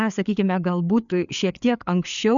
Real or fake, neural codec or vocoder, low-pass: fake; codec, 16 kHz, 4 kbps, FreqCodec, larger model; 7.2 kHz